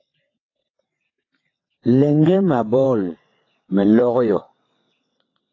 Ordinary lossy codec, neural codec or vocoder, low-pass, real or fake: AAC, 32 kbps; vocoder, 22.05 kHz, 80 mel bands, WaveNeXt; 7.2 kHz; fake